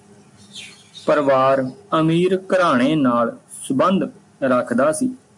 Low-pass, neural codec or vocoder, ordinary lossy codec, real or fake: 10.8 kHz; none; MP3, 64 kbps; real